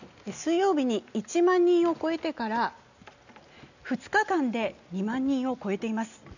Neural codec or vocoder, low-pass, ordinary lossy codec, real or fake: none; 7.2 kHz; none; real